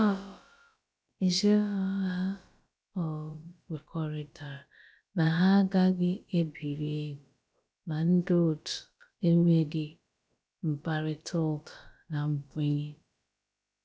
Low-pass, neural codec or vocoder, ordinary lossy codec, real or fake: none; codec, 16 kHz, about 1 kbps, DyCAST, with the encoder's durations; none; fake